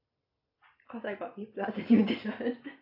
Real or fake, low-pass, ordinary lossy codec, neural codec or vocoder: real; 5.4 kHz; AAC, 48 kbps; none